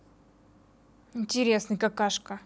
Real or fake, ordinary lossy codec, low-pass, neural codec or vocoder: real; none; none; none